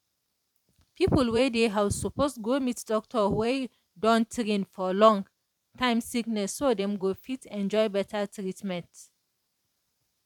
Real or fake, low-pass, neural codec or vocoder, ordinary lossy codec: fake; 19.8 kHz; vocoder, 44.1 kHz, 128 mel bands every 512 samples, BigVGAN v2; none